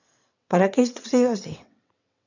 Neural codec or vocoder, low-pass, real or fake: vocoder, 22.05 kHz, 80 mel bands, WaveNeXt; 7.2 kHz; fake